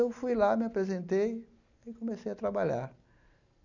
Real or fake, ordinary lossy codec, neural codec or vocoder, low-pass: real; none; none; 7.2 kHz